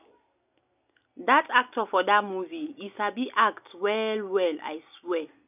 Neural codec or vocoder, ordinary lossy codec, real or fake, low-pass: none; none; real; 3.6 kHz